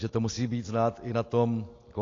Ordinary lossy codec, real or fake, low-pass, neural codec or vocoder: AAC, 32 kbps; real; 7.2 kHz; none